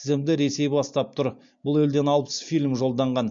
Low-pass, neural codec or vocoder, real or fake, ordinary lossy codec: 7.2 kHz; none; real; MP3, 48 kbps